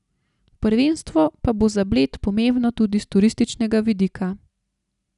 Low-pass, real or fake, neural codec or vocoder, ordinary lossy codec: 10.8 kHz; real; none; none